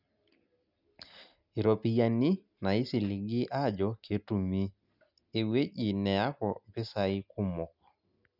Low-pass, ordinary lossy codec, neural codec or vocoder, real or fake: 5.4 kHz; none; none; real